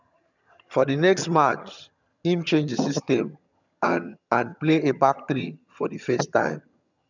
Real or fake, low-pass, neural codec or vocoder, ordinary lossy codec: fake; 7.2 kHz; vocoder, 22.05 kHz, 80 mel bands, HiFi-GAN; none